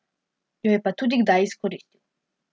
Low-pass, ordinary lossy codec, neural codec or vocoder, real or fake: none; none; none; real